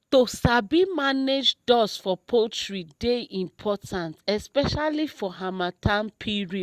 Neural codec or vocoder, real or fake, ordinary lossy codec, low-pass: none; real; Opus, 64 kbps; 14.4 kHz